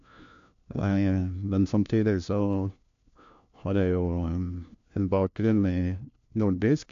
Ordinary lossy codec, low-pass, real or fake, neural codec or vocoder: AAC, 64 kbps; 7.2 kHz; fake; codec, 16 kHz, 1 kbps, FunCodec, trained on LibriTTS, 50 frames a second